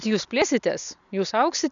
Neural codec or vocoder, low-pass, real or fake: none; 7.2 kHz; real